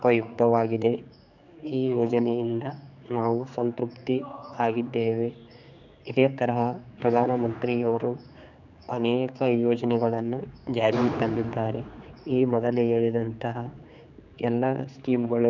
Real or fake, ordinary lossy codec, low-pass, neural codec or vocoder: fake; none; 7.2 kHz; codec, 16 kHz, 4 kbps, X-Codec, HuBERT features, trained on general audio